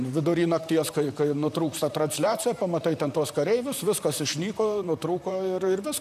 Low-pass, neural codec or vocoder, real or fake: 14.4 kHz; vocoder, 44.1 kHz, 128 mel bands, Pupu-Vocoder; fake